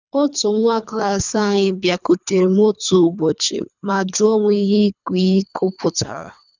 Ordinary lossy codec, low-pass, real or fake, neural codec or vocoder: none; 7.2 kHz; fake; codec, 24 kHz, 3 kbps, HILCodec